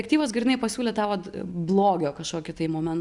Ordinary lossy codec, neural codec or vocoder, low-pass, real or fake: MP3, 96 kbps; none; 10.8 kHz; real